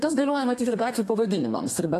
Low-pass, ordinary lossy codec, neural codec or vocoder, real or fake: 14.4 kHz; AAC, 64 kbps; codec, 32 kHz, 1.9 kbps, SNAC; fake